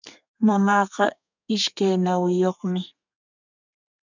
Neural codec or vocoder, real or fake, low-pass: codec, 32 kHz, 1.9 kbps, SNAC; fake; 7.2 kHz